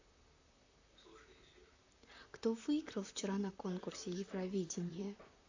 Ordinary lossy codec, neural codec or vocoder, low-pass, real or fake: AAC, 48 kbps; vocoder, 44.1 kHz, 128 mel bands, Pupu-Vocoder; 7.2 kHz; fake